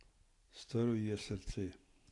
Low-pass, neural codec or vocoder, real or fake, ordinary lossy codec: 10.8 kHz; none; real; AAC, 48 kbps